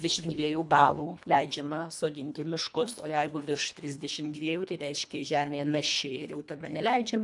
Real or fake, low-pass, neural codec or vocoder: fake; 10.8 kHz; codec, 24 kHz, 1.5 kbps, HILCodec